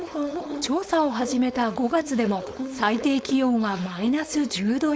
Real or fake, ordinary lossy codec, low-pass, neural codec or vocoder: fake; none; none; codec, 16 kHz, 4.8 kbps, FACodec